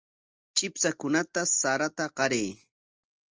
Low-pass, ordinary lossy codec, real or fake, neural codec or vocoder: 7.2 kHz; Opus, 24 kbps; real; none